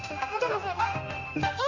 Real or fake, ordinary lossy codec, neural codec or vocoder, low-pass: fake; none; codec, 16 kHz, 1 kbps, X-Codec, HuBERT features, trained on general audio; 7.2 kHz